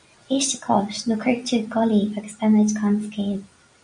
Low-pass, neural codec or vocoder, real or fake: 9.9 kHz; none; real